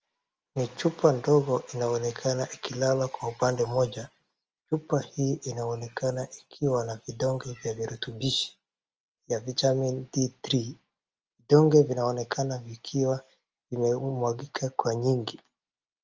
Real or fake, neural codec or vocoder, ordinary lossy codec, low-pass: real; none; Opus, 24 kbps; 7.2 kHz